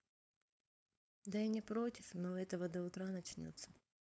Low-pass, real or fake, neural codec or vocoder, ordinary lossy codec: none; fake; codec, 16 kHz, 4.8 kbps, FACodec; none